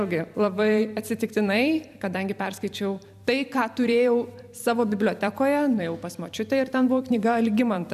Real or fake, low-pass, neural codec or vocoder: fake; 14.4 kHz; vocoder, 44.1 kHz, 128 mel bands every 256 samples, BigVGAN v2